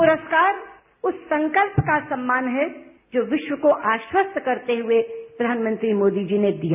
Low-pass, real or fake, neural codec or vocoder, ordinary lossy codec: 3.6 kHz; real; none; none